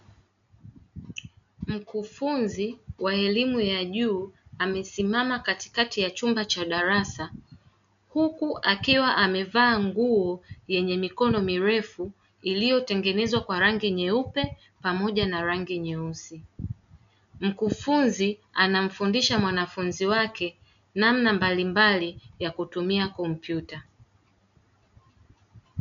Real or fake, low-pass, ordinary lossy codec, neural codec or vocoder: real; 7.2 kHz; MP3, 64 kbps; none